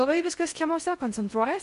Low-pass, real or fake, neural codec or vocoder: 10.8 kHz; fake; codec, 16 kHz in and 24 kHz out, 0.6 kbps, FocalCodec, streaming, 4096 codes